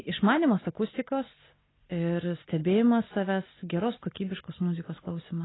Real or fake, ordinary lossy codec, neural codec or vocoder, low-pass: real; AAC, 16 kbps; none; 7.2 kHz